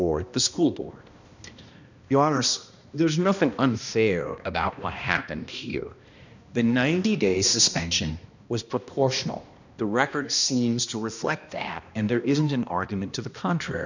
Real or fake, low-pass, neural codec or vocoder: fake; 7.2 kHz; codec, 16 kHz, 1 kbps, X-Codec, HuBERT features, trained on balanced general audio